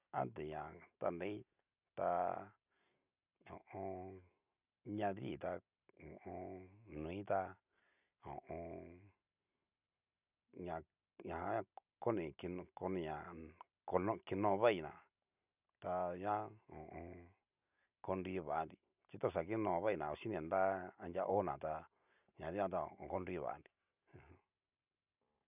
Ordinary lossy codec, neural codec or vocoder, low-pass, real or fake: none; none; 3.6 kHz; real